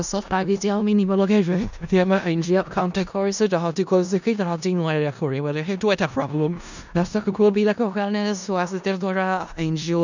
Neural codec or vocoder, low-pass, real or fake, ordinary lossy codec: codec, 16 kHz in and 24 kHz out, 0.4 kbps, LongCat-Audio-Codec, four codebook decoder; 7.2 kHz; fake; none